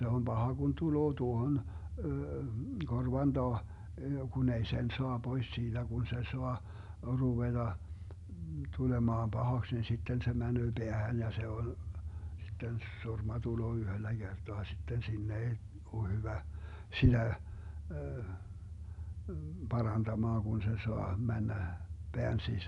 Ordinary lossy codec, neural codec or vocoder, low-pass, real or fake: none; none; 10.8 kHz; real